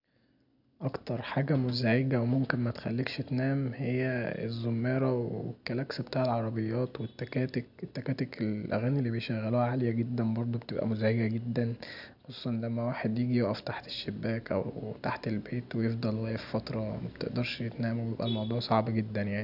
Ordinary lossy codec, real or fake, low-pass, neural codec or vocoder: none; real; 5.4 kHz; none